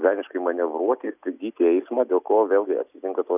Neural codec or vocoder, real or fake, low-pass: none; real; 3.6 kHz